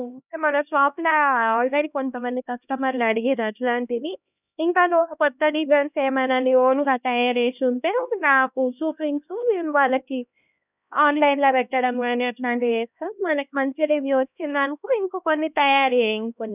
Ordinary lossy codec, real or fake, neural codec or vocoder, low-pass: none; fake; codec, 16 kHz, 1 kbps, X-Codec, HuBERT features, trained on LibriSpeech; 3.6 kHz